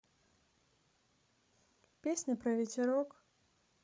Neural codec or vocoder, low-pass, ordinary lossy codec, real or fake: codec, 16 kHz, 16 kbps, FreqCodec, larger model; none; none; fake